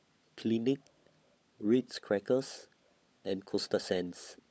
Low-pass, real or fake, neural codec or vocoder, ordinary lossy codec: none; fake; codec, 16 kHz, 16 kbps, FunCodec, trained on LibriTTS, 50 frames a second; none